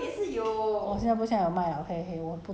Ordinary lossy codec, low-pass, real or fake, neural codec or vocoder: none; none; real; none